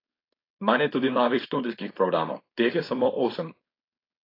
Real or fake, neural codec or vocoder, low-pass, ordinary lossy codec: fake; codec, 16 kHz, 4.8 kbps, FACodec; 5.4 kHz; AAC, 24 kbps